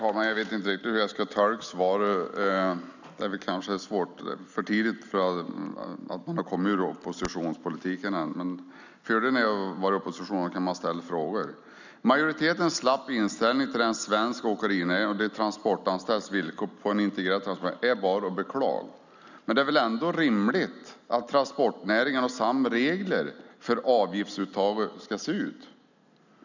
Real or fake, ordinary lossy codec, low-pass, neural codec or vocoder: real; none; 7.2 kHz; none